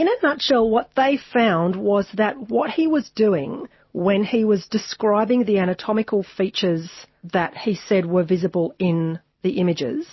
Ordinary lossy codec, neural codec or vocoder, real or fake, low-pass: MP3, 24 kbps; none; real; 7.2 kHz